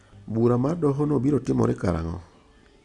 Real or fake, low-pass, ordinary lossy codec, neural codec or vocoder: real; 10.8 kHz; Opus, 64 kbps; none